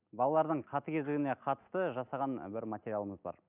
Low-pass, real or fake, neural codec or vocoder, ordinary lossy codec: 3.6 kHz; real; none; none